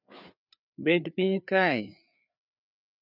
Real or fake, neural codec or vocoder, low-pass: fake; codec, 16 kHz, 4 kbps, FreqCodec, larger model; 5.4 kHz